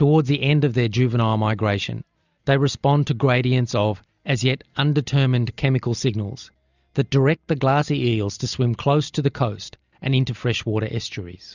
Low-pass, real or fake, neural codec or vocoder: 7.2 kHz; real; none